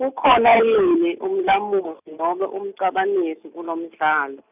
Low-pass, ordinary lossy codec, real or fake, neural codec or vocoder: 3.6 kHz; none; real; none